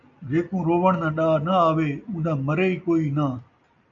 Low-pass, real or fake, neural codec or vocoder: 7.2 kHz; real; none